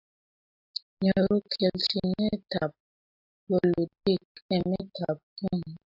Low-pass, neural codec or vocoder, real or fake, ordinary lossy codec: 5.4 kHz; none; real; AAC, 32 kbps